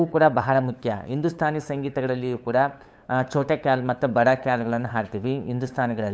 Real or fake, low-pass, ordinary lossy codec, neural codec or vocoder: fake; none; none; codec, 16 kHz, 8 kbps, FunCodec, trained on LibriTTS, 25 frames a second